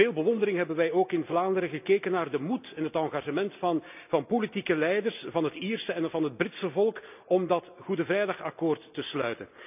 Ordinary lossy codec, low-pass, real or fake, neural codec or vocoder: none; 3.6 kHz; real; none